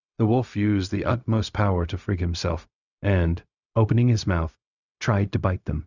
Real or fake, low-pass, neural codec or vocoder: fake; 7.2 kHz; codec, 16 kHz, 0.4 kbps, LongCat-Audio-Codec